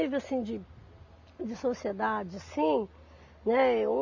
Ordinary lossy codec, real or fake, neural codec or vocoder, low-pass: AAC, 48 kbps; real; none; 7.2 kHz